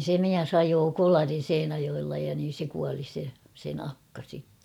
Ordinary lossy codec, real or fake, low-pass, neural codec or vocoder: none; fake; 19.8 kHz; vocoder, 44.1 kHz, 128 mel bands every 512 samples, BigVGAN v2